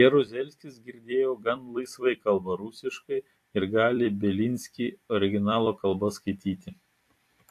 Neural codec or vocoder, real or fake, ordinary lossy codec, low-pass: vocoder, 44.1 kHz, 128 mel bands every 256 samples, BigVGAN v2; fake; AAC, 64 kbps; 14.4 kHz